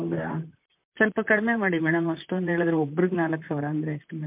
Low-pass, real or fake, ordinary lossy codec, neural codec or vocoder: 3.6 kHz; fake; MP3, 32 kbps; vocoder, 44.1 kHz, 128 mel bands, Pupu-Vocoder